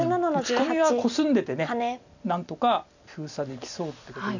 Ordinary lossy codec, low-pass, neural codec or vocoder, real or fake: none; 7.2 kHz; none; real